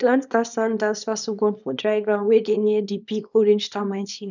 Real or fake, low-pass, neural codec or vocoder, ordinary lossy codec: fake; 7.2 kHz; codec, 24 kHz, 0.9 kbps, WavTokenizer, small release; none